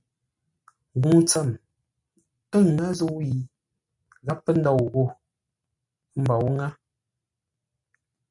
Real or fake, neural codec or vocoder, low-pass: real; none; 10.8 kHz